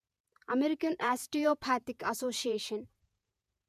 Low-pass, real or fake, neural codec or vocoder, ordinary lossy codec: 14.4 kHz; fake; vocoder, 48 kHz, 128 mel bands, Vocos; MP3, 96 kbps